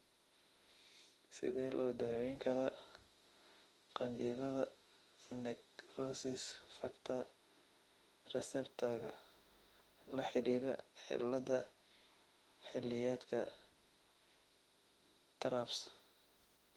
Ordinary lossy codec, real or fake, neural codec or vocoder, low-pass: Opus, 24 kbps; fake; autoencoder, 48 kHz, 32 numbers a frame, DAC-VAE, trained on Japanese speech; 19.8 kHz